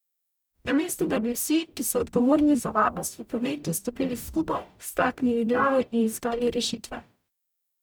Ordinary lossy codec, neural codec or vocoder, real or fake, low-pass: none; codec, 44.1 kHz, 0.9 kbps, DAC; fake; none